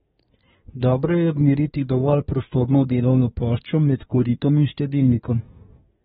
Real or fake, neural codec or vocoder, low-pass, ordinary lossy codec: fake; codec, 24 kHz, 1 kbps, SNAC; 10.8 kHz; AAC, 16 kbps